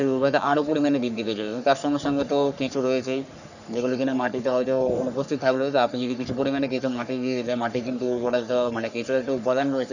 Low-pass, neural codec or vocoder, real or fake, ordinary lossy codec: 7.2 kHz; codec, 44.1 kHz, 3.4 kbps, Pupu-Codec; fake; none